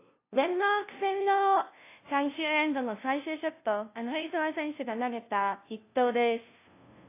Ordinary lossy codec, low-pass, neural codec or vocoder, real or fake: AAC, 24 kbps; 3.6 kHz; codec, 16 kHz, 0.5 kbps, FunCodec, trained on LibriTTS, 25 frames a second; fake